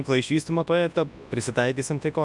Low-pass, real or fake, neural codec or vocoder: 10.8 kHz; fake; codec, 24 kHz, 0.9 kbps, WavTokenizer, large speech release